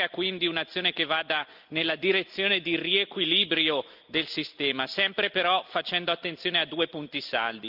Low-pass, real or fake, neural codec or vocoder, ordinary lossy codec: 5.4 kHz; real; none; Opus, 24 kbps